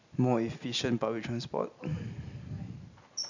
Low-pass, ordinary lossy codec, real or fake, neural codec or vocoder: 7.2 kHz; none; real; none